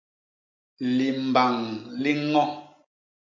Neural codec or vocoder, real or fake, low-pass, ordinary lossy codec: none; real; 7.2 kHz; MP3, 48 kbps